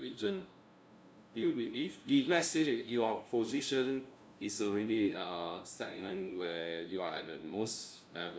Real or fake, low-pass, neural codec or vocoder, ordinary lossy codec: fake; none; codec, 16 kHz, 0.5 kbps, FunCodec, trained on LibriTTS, 25 frames a second; none